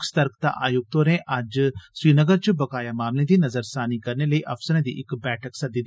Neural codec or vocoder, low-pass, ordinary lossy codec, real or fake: none; none; none; real